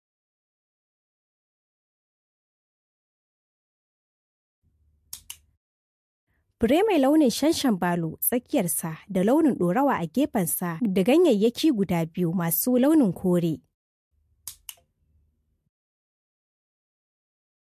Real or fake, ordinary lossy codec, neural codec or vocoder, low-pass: real; MP3, 64 kbps; none; 14.4 kHz